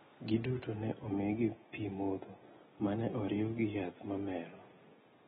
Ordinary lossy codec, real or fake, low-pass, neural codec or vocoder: AAC, 16 kbps; real; 19.8 kHz; none